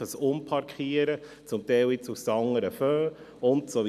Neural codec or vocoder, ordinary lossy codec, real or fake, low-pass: none; none; real; 14.4 kHz